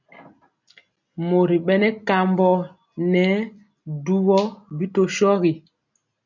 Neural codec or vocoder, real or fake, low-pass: none; real; 7.2 kHz